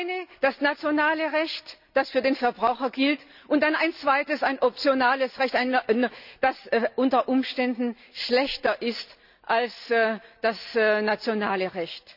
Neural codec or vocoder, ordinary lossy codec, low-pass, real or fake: none; none; 5.4 kHz; real